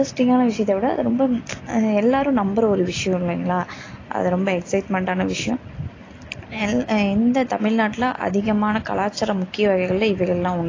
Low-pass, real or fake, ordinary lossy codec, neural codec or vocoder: 7.2 kHz; real; AAC, 32 kbps; none